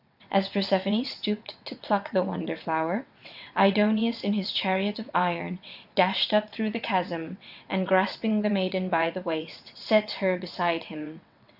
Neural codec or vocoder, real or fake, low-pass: vocoder, 22.05 kHz, 80 mel bands, WaveNeXt; fake; 5.4 kHz